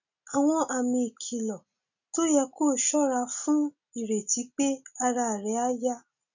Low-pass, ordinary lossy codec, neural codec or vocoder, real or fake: 7.2 kHz; none; none; real